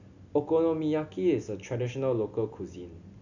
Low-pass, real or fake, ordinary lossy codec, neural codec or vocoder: 7.2 kHz; real; none; none